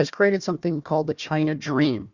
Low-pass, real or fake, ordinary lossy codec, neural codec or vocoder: 7.2 kHz; fake; Opus, 64 kbps; codec, 16 kHz, 1 kbps, FunCodec, trained on Chinese and English, 50 frames a second